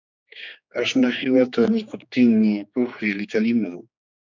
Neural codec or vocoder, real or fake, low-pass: codec, 16 kHz, 2 kbps, X-Codec, HuBERT features, trained on general audio; fake; 7.2 kHz